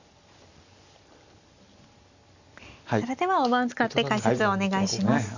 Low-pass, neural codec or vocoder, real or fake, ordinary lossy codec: 7.2 kHz; none; real; Opus, 64 kbps